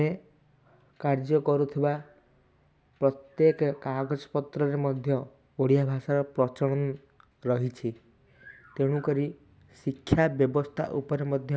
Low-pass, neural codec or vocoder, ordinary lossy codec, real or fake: none; none; none; real